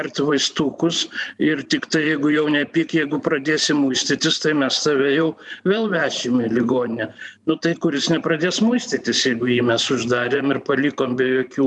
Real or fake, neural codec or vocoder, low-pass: fake; vocoder, 44.1 kHz, 128 mel bands every 512 samples, BigVGAN v2; 10.8 kHz